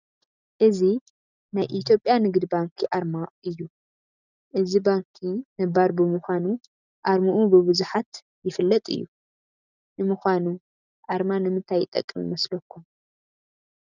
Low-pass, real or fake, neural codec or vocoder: 7.2 kHz; real; none